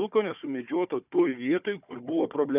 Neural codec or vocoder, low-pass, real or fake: codec, 16 kHz, 4 kbps, FunCodec, trained on Chinese and English, 50 frames a second; 3.6 kHz; fake